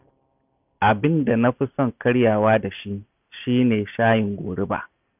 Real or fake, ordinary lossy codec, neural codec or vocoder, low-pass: real; none; none; 3.6 kHz